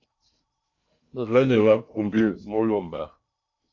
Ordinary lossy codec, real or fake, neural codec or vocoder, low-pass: AAC, 48 kbps; fake; codec, 16 kHz in and 24 kHz out, 0.6 kbps, FocalCodec, streaming, 2048 codes; 7.2 kHz